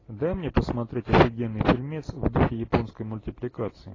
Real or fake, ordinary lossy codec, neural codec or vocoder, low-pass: real; AAC, 32 kbps; none; 7.2 kHz